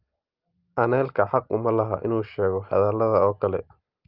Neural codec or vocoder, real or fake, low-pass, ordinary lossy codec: none; real; 5.4 kHz; Opus, 24 kbps